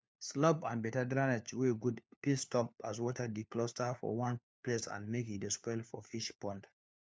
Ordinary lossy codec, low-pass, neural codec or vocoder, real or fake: none; none; codec, 16 kHz, 4 kbps, FunCodec, trained on LibriTTS, 50 frames a second; fake